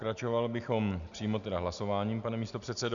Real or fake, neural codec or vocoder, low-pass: real; none; 7.2 kHz